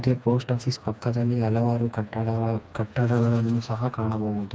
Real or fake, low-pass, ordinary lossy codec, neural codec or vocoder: fake; none; none; codec, 16 kHz, 2 kbps, FreqCodec, smaller model